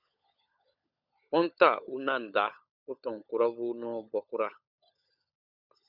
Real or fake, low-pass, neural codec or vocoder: fake; 5.4 kHz; codec, 16 kHz, 16 kbps, FunCodec, trained on LibriTTS, 50 frames a second